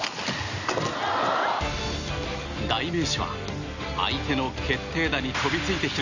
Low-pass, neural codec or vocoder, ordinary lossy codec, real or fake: 7.2 kHz; none; none; real